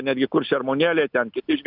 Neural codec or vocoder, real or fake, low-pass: none; real; 5.4 kHz